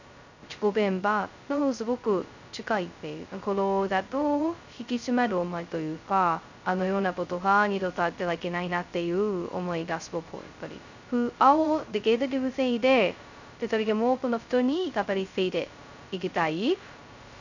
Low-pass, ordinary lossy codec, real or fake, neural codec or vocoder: 7.2 kHz; none; fake; codec, 16 kHz, 0.2 kbps, FocalCodec